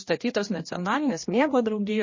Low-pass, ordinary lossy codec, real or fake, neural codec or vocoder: 7.2 kHz; MP3, 32 kbps; fake; codec, 16 kHz, 1 kbps, X-Codec, HuBERT features, trained on general audio